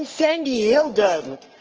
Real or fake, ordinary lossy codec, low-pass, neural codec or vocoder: fake; Opus, 16 kbps; 7.2 kHz; codec, 44.1 kHz, 3.4 kbps, Pupu-Codec